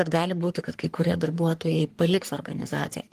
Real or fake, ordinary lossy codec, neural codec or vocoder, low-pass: fake; Opus, 24 kbps; codec, 44.1 kHz, 3.4 kbps, Pupu-Codec; 14.4 kHz